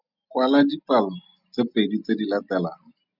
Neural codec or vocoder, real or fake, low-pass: none; real; 5.4 kHz